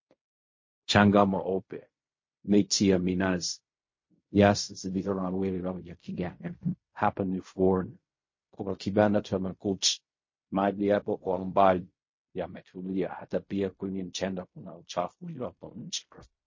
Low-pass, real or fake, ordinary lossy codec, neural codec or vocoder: 7.2 kHz; fake; MP3, 32 kbps; codec, 16 kHz in and 24 kHz out, 0.4 kbps, LongCat-Audio-Codec, fine tuned four codebook decoder